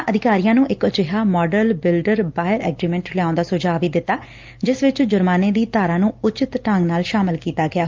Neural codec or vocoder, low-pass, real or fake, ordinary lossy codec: none; 7.2 kHz; real; Opus, 32 kbps